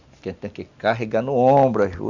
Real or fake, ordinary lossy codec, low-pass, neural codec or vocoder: real; none; 7.2 kHz; none